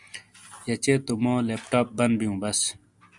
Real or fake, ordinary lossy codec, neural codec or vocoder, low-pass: real; Opus, 64 kbps; none; 10.8 kHz